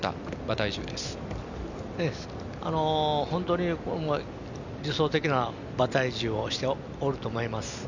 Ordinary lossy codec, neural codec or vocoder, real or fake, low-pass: none; none; real; 7.2 kHz